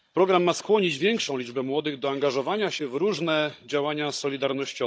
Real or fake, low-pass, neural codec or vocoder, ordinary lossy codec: fake; none; codec, 16 kHz, 16 kbps, FunCodec, trained on Chinese and English, 50 frames a second; none